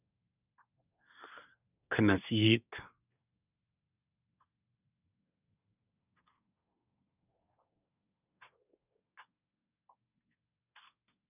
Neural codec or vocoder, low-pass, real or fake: codec, 24 kHz, 1 kbps, SNAC; 3.6 kHz; fake